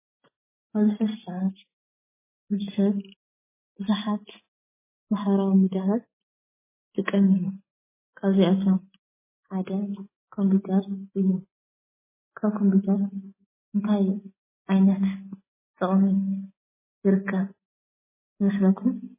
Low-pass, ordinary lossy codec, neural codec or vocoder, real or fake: 3.6 kHz; MP3, 16 kbps; none; real